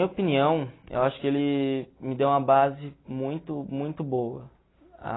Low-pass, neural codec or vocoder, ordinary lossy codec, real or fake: 7.2 kHz; none; AAC, 16 kbps; real